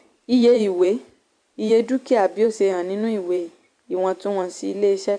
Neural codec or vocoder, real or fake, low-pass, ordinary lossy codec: vocoder, 44.1 kHz, 128 mel bands every 512 samples, BigVGAN v2; fake; 9.9 kHz; AAC, 64 kbps